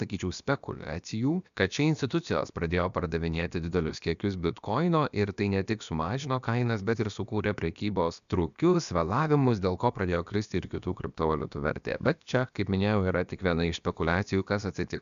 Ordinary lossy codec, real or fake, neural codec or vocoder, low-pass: MP3, 96 kbps; fake; codec, 16 kHz, about 1 kbps, DyCAST, with the encoder's durations; 7.2 kHz